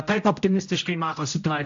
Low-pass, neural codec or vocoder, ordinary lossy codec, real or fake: 7.2 kHz; codec, 16 kHz, 0.5 kbps, X-Codec, HuBERT features, trained on general audio; AAC, 64 kbps; fake